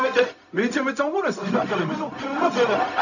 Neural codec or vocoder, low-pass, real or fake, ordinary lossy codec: codec, 16 kHz, 0.4 kbps, LongCat-Audio-Codec; 7.2 kHz; fake; none